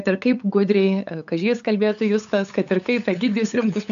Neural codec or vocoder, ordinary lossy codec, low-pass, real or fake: codec, 16 kHz, 8 kbps, FunCodec, trained on LibriTTS, 25 frames a second; AAC, 96 kbps; 7.2 kHz; fake